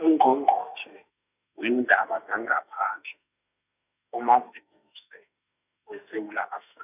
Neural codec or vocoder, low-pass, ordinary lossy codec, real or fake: autoencoder, 48 kHz, 32 numbers a frame, DAC-VAE, trained on Japanese speech; 3.6 kHz; none; fake